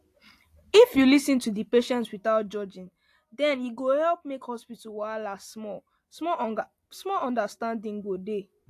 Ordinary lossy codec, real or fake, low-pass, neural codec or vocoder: AAC, 64 kbps; real; 14.4 kHz; none